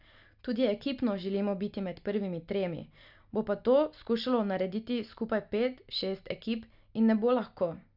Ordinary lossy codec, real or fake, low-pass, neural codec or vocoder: none; real; 5.4 kHz; none